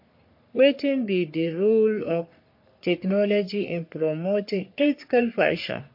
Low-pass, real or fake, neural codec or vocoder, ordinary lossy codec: 5.4 kHz; fake; codec, 44.1 kHz, 3.4 kbps, Pupu-Codec; MP3, 32 kbps